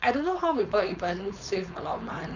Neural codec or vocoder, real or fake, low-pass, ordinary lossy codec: codec, 16 kHz, 4.8 kbps, FACodec; fake; 7.2 kHz; none